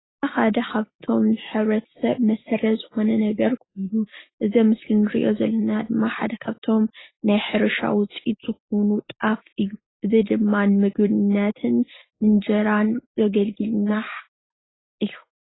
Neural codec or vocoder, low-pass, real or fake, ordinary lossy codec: none; 7.2 kHz; real; AAC, 16 kbps